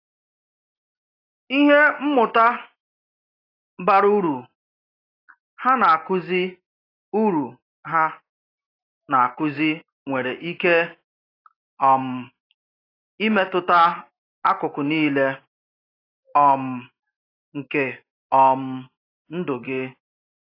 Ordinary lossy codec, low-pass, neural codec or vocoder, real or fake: AAC, 24 kbps; 5.4 kHz; none; real